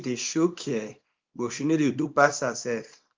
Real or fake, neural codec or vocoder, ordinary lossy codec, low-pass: fake; codec, 24 kHz, 0.9 kbps, WavTokenizer, small release; Opus, 32 kbps; 7.2 kHz